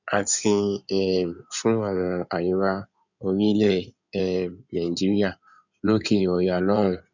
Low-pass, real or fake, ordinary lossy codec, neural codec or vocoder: 7.2 kHz; fake; none; codec, 16 kHz in and 24 kHz out, 2.2 kbps, FireRedTTS-2 codec